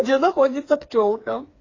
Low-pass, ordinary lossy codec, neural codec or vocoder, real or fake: 7.2 kHz; AAC, 32 kbps; codec, 16 kHz, 4 kbps, FreqCodec, smaller model; fake